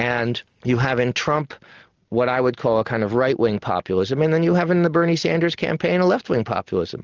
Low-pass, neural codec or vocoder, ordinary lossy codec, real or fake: 7.2 kHz; none; Opus, 32 kbps; real